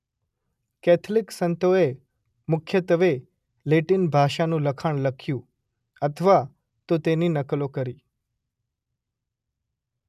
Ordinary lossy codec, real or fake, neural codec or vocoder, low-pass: none; real; none; 14.4 kHz